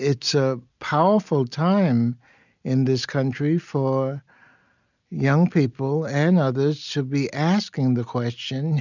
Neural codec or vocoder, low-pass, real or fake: none; 7.2 kHz; real